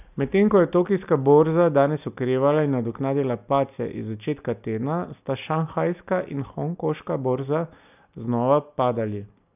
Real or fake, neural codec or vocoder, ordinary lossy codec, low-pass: real; none; none; 3.6 kHz